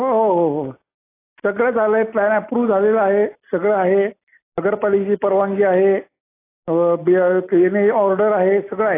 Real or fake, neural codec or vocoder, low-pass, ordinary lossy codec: real; none; 3.6 kHz; AAC, 24 kbps